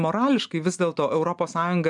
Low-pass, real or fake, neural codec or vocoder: 10.8 kHz; real; none